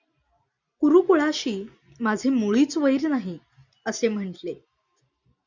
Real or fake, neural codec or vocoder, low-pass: real; none; 7.2 kHz